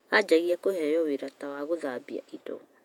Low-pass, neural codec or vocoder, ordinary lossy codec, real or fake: 19.8 kHz; vocoder, 48 kHz, 128 mel bands, Vocos; none; fake